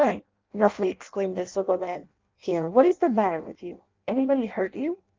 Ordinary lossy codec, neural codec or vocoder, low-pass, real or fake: Opus, 24 kbps; codec, 16 kHz in and 24 kHz out, 0.6 kbps, FireRedTTS-2 codec; 7.2 kHz; fake